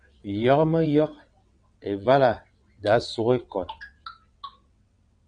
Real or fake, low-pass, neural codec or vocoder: fake; 9.9 kHz; vocoder, 22.05 kHz, 80 mel bands, WaveNeXt